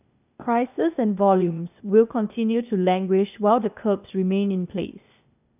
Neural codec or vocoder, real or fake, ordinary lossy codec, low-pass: codec, 16 kHz, 0.8 kbps, ZipCodec; fake; none; 3.6 kHz